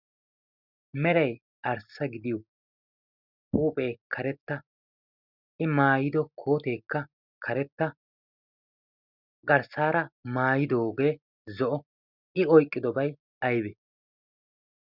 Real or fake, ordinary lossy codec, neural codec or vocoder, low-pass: real; Opus, 64 kbps; none; 5.4 kHz